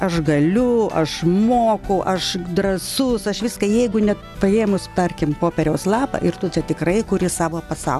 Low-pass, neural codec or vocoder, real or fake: 14.4 kHz; none; real